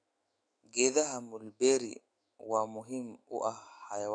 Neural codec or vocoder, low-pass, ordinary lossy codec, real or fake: autoencoder, 48 kHz, 128 numbers a frame, DAC-VAE, trained on Japanese speech; 9.9 kHz; AAC, 48 kbps; fake